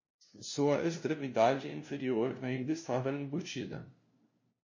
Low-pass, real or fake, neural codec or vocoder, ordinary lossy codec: 7.2 kHz; fake; codec, 16 kHz, 0.5 kbps, FunCodec, trained on LibriTTS, 25 frames a second; MP3, 32 kbps